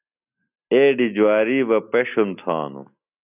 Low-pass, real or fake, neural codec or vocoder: 3.6 kHz; real; none